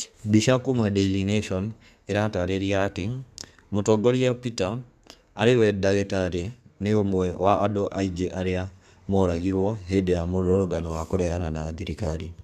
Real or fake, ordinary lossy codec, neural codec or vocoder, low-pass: fake; none; codec, 32 kHz, 1.9 kbps, SNAC; 14.4 kHz